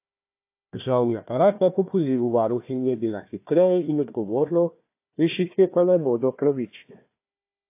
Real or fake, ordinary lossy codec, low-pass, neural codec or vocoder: fake; AAC, 24 kbps; 3.6 kHz; codec, 16 kHz, 1 kbps, FunCodec, trained on Chinese and English, 50 frames a second